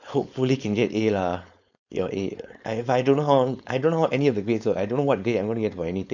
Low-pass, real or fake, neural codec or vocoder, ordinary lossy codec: 7.2 kHz; fake; codec, 16 kHz, 4.8 kbps, FACodec; none